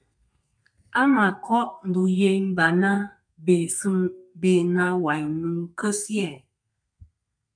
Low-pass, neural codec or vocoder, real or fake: 9.9 kHz; codec, 32 kHz, 1.9 kbps, SNAC; fake